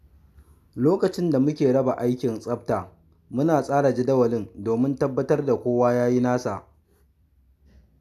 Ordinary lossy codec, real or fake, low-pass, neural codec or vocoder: none; real; 14.4 kHz; none